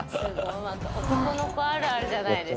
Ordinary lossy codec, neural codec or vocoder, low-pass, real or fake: none; none; none; real